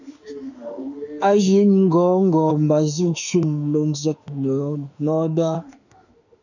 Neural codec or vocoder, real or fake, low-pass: autoencoder, 48 kHz, 32 numbers a frame, DAC-VAE, trained on Japanese speech; fake; 7.2 kHz